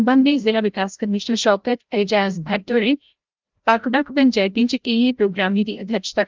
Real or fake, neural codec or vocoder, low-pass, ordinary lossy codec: fake; codec, 16 kHz, 0.5 kbps, FreqCodec, larger model; 7.2 kHz; Opus, 32 kbps